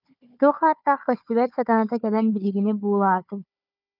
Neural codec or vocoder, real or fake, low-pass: codec, 16 kHz, 4 kbps, FunCodec, trained on Chinese and English, 50 frames a second; fake; 5.4 kHz